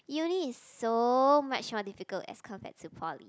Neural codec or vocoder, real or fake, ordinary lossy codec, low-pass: none; real; none; none